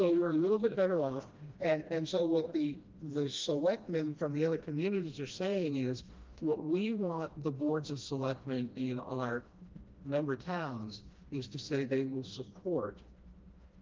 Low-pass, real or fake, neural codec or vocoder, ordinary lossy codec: 7.2 kHz; fake; codec, 16 kHz, 1 kbps, FreqCodec, smaller model; Opus, 32 kbps